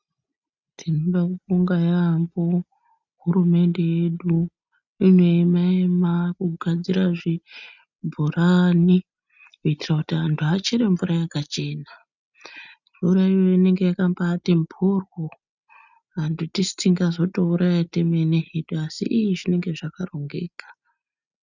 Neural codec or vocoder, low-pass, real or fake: none; 7.2 kHz; real